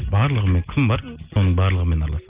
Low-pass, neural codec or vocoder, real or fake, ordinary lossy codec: 3.6 kHz; none; real; Opus, 24 kbps